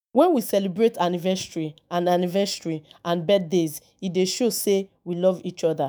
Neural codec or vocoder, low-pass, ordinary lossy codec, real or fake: autoencoder, 48 kHz, 128 numbers a frame, DAC-VAE, trained on Japanese speech; none; none; fake